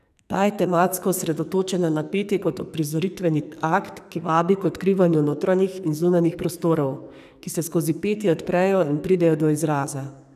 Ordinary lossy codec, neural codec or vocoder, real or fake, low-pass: none; codec, 44.1 kHz, 2.6 kbps, SNAC; fake; 14.4 kHz